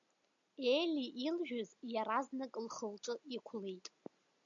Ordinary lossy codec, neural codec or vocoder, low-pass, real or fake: MP3, 64 kbps; none; 7.2 kHz; real